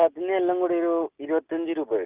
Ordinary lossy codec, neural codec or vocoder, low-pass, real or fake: Opus, 32 kbps; none; 3.6 kHz; real